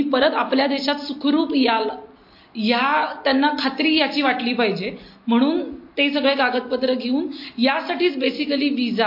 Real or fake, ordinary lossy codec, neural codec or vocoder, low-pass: real; MP3, 32 kbps; none; 5.4 kHz